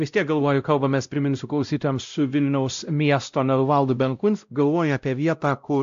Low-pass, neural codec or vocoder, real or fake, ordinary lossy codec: 7.2 kHz; codec, 16 kHz, 0.5 kbps, X-Codec, WavLM features, trained on Multilingual LibriSpeech; fake; AAC, 64 kbps